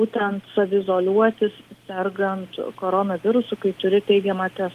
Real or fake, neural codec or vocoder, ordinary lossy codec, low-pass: real; none; Opus, 24 kbps; 14.4 kHz